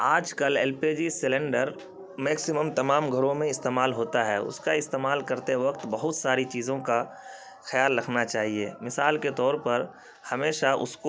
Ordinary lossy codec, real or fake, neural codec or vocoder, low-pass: none; real; none; none